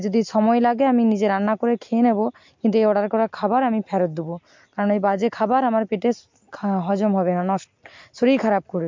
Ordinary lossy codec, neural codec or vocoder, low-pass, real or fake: MP3, 48 kbps; none; 7.2 kHz; real